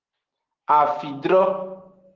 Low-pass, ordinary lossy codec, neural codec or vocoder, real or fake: 7.2 kHz; Opus, 16 kbps; none; real